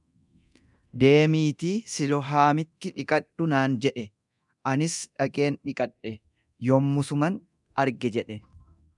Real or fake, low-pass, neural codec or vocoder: fake; 10.8 kHz; codec, 24 kHz, 0.9 kbps, DualCodec